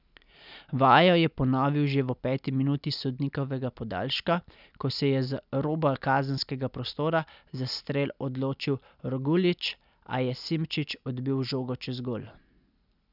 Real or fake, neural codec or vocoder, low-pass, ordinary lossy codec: real; none; 5.4 kHz; none